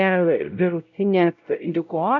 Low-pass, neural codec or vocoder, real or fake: 7.2 kHz; codec, 16 kHz, 0.5 kbps, X-Codec, WavLM features, trained on Multilingual LibriSpeech; fake